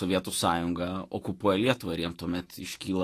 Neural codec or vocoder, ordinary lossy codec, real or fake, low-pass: autoencoder, 48 kHz, 128 numbers a frame, DAC-VAE, trained on Japanese speech; AAC, 48 kbps; fake; 14.4 kHz